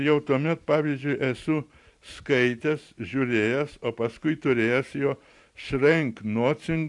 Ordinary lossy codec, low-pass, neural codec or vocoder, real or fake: AAC, 64 kbps; 10.8 kHz; vocoder, 44.1 kHz, 128 mel bands every 512 samples, BigVGAN v2; fake